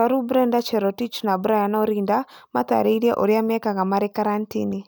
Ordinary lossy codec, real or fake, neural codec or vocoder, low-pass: none; real; none; none